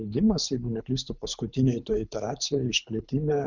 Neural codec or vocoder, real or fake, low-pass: codec, 24 kHz, 6 kbps, HILCodec; fake; 7.2 kHz